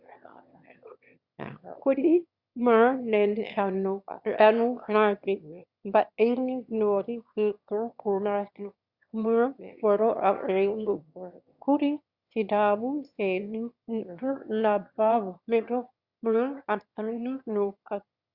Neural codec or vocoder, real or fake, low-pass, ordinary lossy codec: autoencoder, 22.05 kHz, a latent of 192 numbers a frame, VITS, trained on one speaker; fake; 5.4 kHz; Opus, 64 kbps